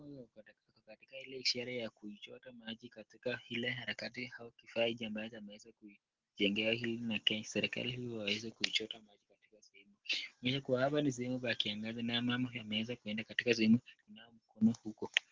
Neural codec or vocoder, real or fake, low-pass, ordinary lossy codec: none; real; 7.2 kHz; Opus, 16 kbps